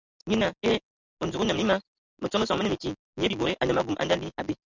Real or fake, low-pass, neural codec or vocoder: real; 7.2 kHz; none